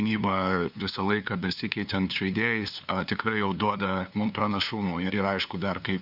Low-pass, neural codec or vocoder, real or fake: 5.4 kHz; codec, 16 kHz, 2 kbps, FunCodec, trained on LibriTTS, 25 frames a second; fake